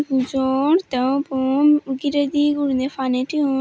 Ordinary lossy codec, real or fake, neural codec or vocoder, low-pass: none; real; none; none